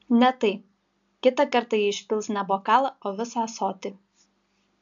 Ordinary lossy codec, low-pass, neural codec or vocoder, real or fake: AAC, 64 kbps; 7.2 kHz; none; real